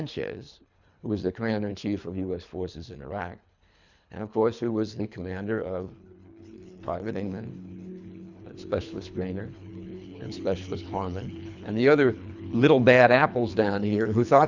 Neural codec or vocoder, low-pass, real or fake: codec, 24 kHz, 3 kbps, HILCodec; 7.2 kHz; fake